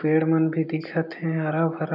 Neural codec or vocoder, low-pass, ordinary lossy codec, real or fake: none; 5.4 kHz; none; real